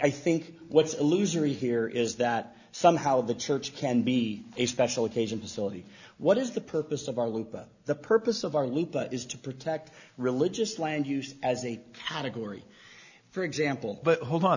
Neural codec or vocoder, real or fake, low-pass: none; real; 7.2 kHz